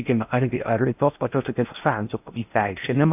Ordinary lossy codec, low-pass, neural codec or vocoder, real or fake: AAC, 32 kbps; 3.6 kHz; codec, 16 kHz in and 24 kHz out, 0.6 kbps, FocalCodec, streaming, 4096 codes; fake